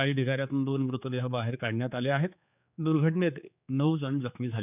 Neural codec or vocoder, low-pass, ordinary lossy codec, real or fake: codec, 16 kHz, 4 kbps, X-Codec, HuBERT features, trained on general audio; 3.6 kHz; none; fake